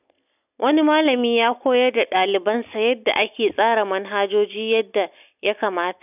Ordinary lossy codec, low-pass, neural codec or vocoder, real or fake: none; 3.6 kHz; none; real